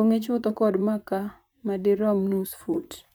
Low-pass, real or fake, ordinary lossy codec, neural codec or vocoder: none; fake; none; vocoder, 44.1 kHz, 128 mel bands, Pupu-Vocoder